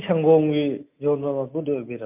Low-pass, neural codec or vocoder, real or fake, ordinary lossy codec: 3.6 kHz; none; real; MP3, 32 kbps